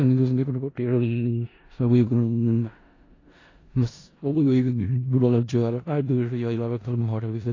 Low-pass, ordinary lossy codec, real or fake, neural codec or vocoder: 7.2 kHz; AAC, 32 kbps; fake; codec, 16 kHz in and 24 kHz out, 0.4 kbps, LongCat-Audio-Codec, four codebook decoder